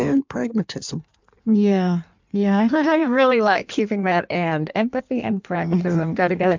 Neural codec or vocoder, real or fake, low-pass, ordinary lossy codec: codec, 16 kHz in and 24 kHz out, 1.1 kbps, FireRedTTS-2 codec; fake; 7.2 kHz; MP3, 64 kbps